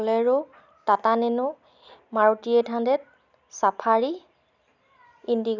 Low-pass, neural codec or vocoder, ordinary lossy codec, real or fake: 7.2 kHz; none; none; real